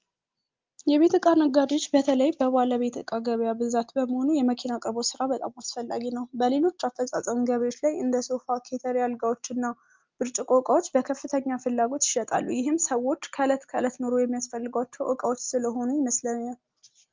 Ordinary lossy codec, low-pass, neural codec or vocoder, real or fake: Opus, 32 kbps; 7.2 kHz; none; real